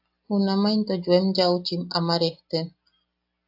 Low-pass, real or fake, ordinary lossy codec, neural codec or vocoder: 5.4 kHz; real; AAC, 48 kbps; none